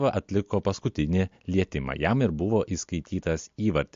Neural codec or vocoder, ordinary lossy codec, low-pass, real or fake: none; MP3, 48 kbps; 7.2 kHz; real